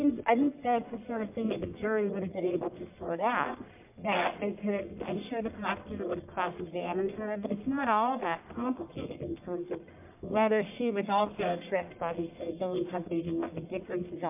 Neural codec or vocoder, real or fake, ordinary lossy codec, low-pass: codec, 44.1 kHz, 1.7 kbps, Pupu-Codec; fake; MP3, 32 kbps; 3.6 kHz